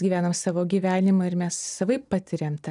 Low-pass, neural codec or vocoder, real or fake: 10.8 kHz; none; real